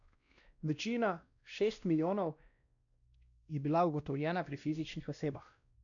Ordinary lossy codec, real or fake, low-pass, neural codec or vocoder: none; fake; 7.2 kHz; codec, 16 kHz, 1 kbps, X-Codec, WavLM features, trained on Multilingual LibriSpeech